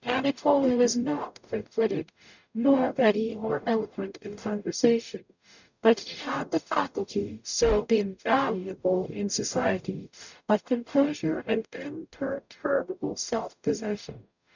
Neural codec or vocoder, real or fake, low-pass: codec, 44.1 kHz, 0.9 kbps, DAC; fake; 7.2 kHz